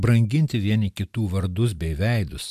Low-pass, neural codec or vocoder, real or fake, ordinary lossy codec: 14.4 kHz; none; real; MP3, 96 kbps